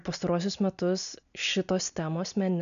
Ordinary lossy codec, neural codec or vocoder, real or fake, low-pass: AAC, 96 kbps; none; real; 7.2 kHz